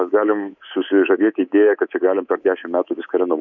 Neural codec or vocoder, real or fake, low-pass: codec, 24 kHz, 3.1 kbps, DualCodec; fake; 7.2 kHz